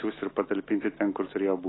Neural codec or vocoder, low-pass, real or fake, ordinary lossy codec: none; 7.2 kHz; real; AAC, 16 kbps